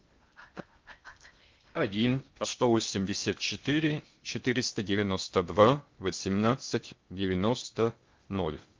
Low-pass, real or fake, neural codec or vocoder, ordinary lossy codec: 7.2 kHz; fake; codec, 16 kHz in and 24 kHz out, 0.6 kbps, FocalCodec, streaming, 2048 codes; Opus, 32 kbps